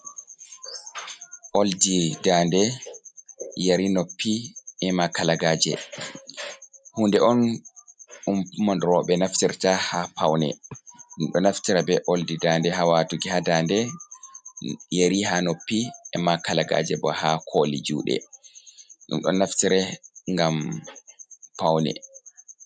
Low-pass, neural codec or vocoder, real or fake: 9.9 kHz; none; real